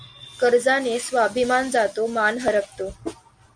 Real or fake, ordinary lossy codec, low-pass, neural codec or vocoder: real; Opus, 64 kbps; 9.9 kHz; none